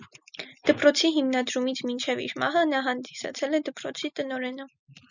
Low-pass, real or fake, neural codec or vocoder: 7.2 kHz; real; none